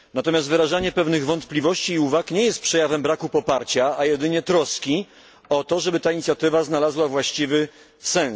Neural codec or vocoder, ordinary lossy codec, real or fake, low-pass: none; none; real; none